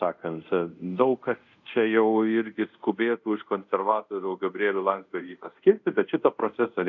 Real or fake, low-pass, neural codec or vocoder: fake; 7.2 kHz; codec, 24 kHz, 0.5 kbps, DualCodec